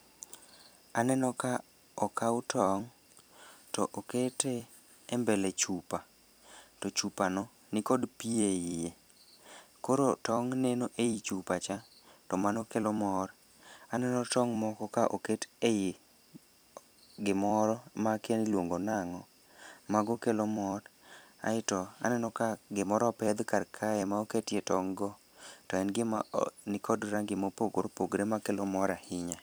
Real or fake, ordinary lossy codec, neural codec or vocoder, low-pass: fake; none; vocoder, 44.1 kHz, 128 mel bands every 256 samples, BigVGAN v2; none